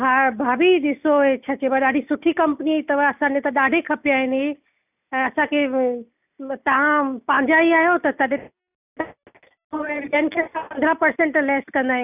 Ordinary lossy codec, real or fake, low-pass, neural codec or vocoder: none; real; 3.6 kHz; none